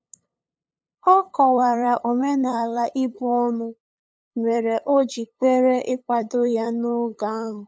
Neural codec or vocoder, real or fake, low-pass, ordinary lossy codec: codec, 16 kHz, 8 kbps, FunCodec, trained on LibriTTS, 25 frames a second; fake; none; none